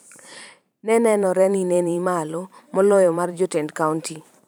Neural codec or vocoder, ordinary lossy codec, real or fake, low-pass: vocoder, 44.1 kHz, 128 mel bands every 512 samples, BigVGAN v2; none; fake; none